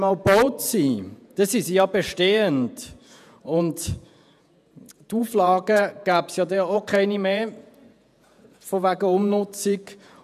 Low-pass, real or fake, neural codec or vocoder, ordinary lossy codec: 14.4 kHz; fake; vocoder, 48 kHz, 128 mel bands, Vocos; none